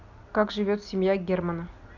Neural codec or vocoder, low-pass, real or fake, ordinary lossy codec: none; 7.2 kHz; real; none